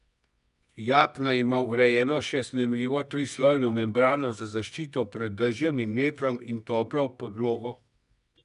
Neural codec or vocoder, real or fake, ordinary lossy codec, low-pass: codec, 24 kHz, 0.9 kbps, WavTokenizer, medium music audio release; fake; none; 10.8 kHz